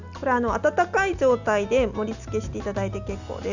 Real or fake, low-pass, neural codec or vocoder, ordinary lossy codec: real; 7.2 kHz; none; none